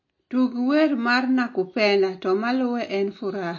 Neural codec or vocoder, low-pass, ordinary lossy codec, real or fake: none; 7.2 kHz; MP3, 32 kbps; real